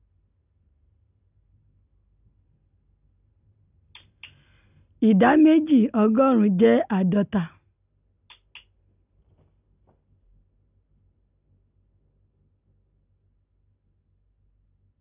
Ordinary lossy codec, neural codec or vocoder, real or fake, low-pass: none; none; real; 3.6 kHz